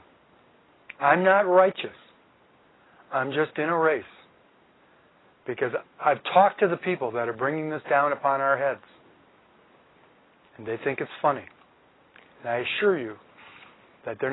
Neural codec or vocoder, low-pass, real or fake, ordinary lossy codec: none; 7.2 kHz; real; AAC, 16 kbps